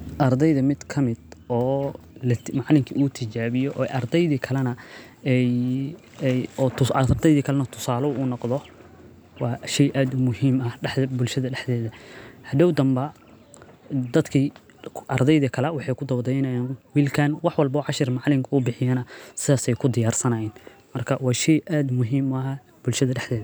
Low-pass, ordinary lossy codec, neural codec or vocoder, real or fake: none; none; none; real